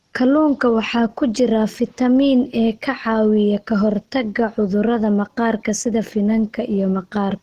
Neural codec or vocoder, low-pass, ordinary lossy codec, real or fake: none; 14.4 kHz; Opus, 16 kbps; real